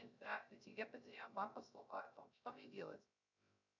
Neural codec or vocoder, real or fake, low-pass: codec, 16 kHz, 0.3 kbps, FocalCodec; fake; 7.2 kHz